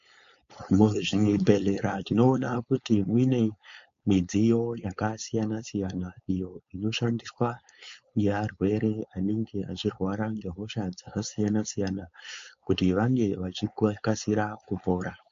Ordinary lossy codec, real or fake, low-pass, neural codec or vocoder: MP3, 48 kbps; fake; 7.2 kHz; codec, 16 kHz, 4.8 kbps, FACodec